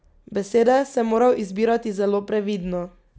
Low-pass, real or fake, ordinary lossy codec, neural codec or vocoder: none; real; none; none